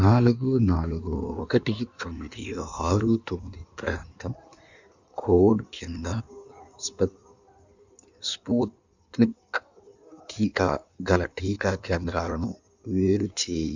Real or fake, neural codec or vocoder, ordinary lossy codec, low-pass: fake; codec, 16 kHz in and 24 kHz out, 1.1 kbps, FireRedTTS-2 codec; none; 7.2 kHz